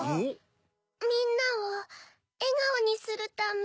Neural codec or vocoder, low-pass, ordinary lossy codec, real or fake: none; none; none; real